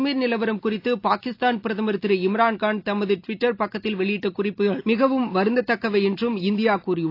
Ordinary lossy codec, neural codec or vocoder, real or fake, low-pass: AAC, 32 kbps; none; real; 5.4 kHz